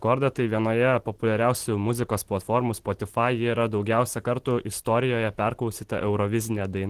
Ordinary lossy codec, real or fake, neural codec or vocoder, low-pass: Opus, 16 kbps; real; none; 14.4 kHz